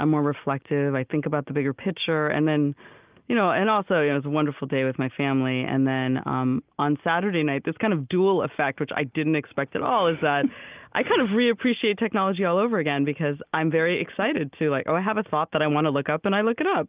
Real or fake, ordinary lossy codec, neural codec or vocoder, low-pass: real; Opus, 24 kbps; none; 3.6 kHz